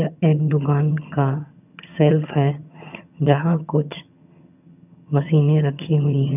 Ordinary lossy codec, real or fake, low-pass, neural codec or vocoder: none; fake; 3.6 kHz; vocoder, 22.05 kHz, 80 mel bands, HiFi-GAN